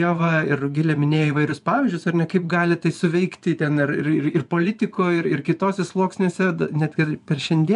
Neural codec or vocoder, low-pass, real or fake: vocoder, 24 kHz, 100 mel bands, Vocos; 10.8 kHz; fake